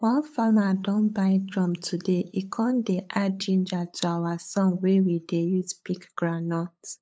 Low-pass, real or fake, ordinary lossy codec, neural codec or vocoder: none; fake; none; codec, 16 kHz, 8 kbps, FunCodec, trained on LibriTTS, 25 frames a second